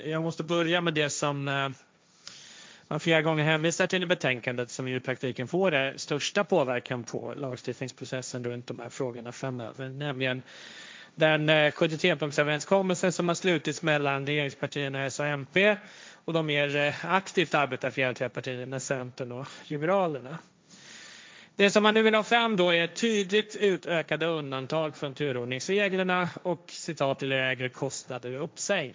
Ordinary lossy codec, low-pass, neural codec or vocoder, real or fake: none; none; codec, 16 kHz, 1.1 kbps, Voila-Tokenizer; fake